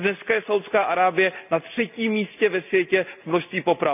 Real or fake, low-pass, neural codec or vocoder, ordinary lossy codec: real; 3.6 kHz; none; none